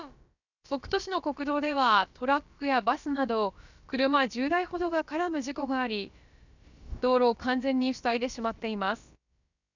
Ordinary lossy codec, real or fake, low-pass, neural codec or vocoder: none; fake; 7.2 kHz; codec, 16 kHz, about 1 kbps, DyCAST, with the encoder's durations